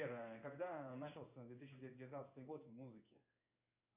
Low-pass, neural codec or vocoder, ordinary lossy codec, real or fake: 3.6 kHz; codec, 16 kHz in and 24 kHz out, 1 kbps, XY-Tokenizer; AAC, 32 kbps; fake